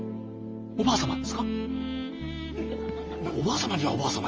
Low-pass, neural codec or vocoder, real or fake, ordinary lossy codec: 7.2 kHz; none; real; Opus, 24 kbps